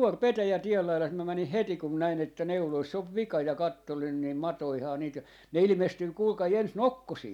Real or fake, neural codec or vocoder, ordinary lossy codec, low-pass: real; none; none; 19.8 kHz